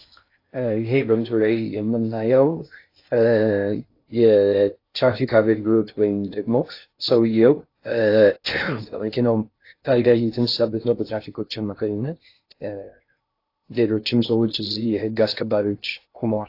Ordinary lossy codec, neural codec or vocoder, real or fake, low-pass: AAC, 32 kbps; codec, 16 kHz in and 24 kHz out, 0.6 kbps, FocalCodec, streaming, 4096 codes; fake; 5.4 kHz